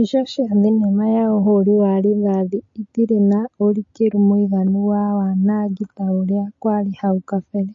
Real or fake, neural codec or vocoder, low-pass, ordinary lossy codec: real; none; 7.2 kHz; MP3, 32 kbps